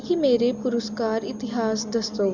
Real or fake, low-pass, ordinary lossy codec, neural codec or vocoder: real; 7.2 kHz; none; none